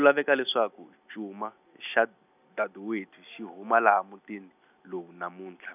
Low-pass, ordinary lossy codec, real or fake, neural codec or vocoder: 3.6 kHz; none; real; none